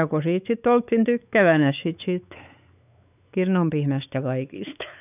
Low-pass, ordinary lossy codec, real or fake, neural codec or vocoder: 3.6 kHz; none; fake; codec, 16 kHz, 4 kbps, X-Codec, WavLM features, trained on Multilingual LibriSpeech